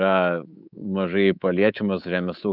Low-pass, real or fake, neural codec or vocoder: 5.4 kHz; fake; codec, 24 kHz, 3.1 kbps, DualCodec